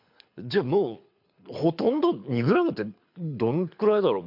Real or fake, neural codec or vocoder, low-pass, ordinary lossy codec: fake; codec, 24 kHz, 6 kbps, HILCodec; 5.4 kHz; MP3, 48 kbps